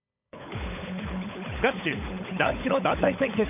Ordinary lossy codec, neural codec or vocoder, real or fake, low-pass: none; codec, 16 kHz, 16 kbps, FunCodec, trained on LibriTTS, 50 frames a second; fake; 3.6 kHz